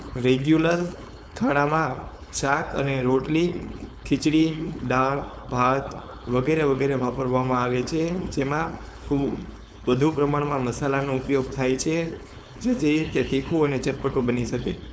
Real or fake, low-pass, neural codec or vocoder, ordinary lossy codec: fake; none; codec, 16 kHz, 4.8 kbps, FACodec; none